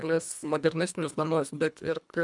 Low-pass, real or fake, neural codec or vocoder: 10.8 kHz; fake; codec, 24 kHz, 1.5 kbps, HILCodec